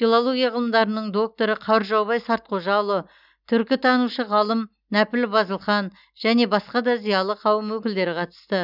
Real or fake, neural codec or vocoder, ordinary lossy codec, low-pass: real; none; none; 5.4 kHz